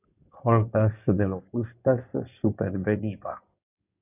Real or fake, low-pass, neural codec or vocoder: fake; 3.6 kHz; codec, 16 kHz in and 24 kHz out, 2.2 kbps, FireRedTTS-2 codec